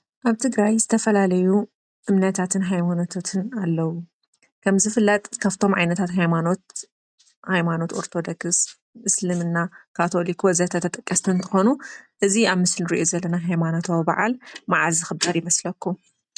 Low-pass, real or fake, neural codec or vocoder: 9.9 kHz; real; none